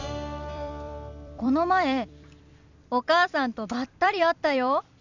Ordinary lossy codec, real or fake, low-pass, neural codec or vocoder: none; fake; 7.2 kHz; vocoder, 44.1 kHz, 128 mel bands every 256 samples, BigVGAN v2